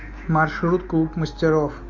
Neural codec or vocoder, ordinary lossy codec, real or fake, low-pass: none; MP3, 48 kbps; real; 7.2 kHz